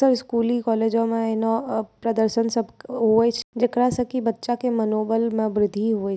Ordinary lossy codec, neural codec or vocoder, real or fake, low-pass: none; none; real; none